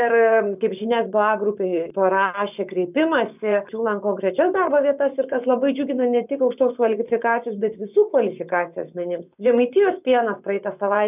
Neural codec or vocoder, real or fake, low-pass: vocoder, 24 kHz, 100 mel bands, Vocos; fake; 3.6 kHz